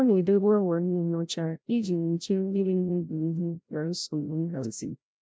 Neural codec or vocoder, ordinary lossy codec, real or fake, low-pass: codec, 16 kHz, 0.5 kbps, FreqCodec, larger model; none; fake; none